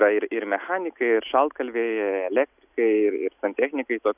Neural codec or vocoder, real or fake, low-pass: none; real; 3.6 kHz